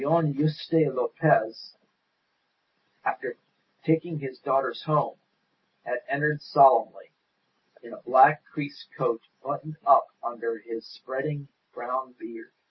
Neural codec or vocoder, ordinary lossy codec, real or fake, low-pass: none; MP3, 24 kbps; real; 7.2 kHz